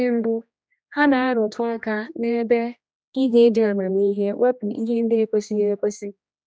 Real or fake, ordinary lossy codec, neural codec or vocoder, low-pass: fake; none; codec, 16 kHz, 1 kbps, X-Codec, HuBERT features, trained on general audio; none